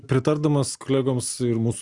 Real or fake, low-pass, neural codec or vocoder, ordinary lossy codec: real; 10.8 kHz; none; Opus, 64 kbps